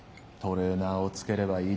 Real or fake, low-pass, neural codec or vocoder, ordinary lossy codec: real; none; none; none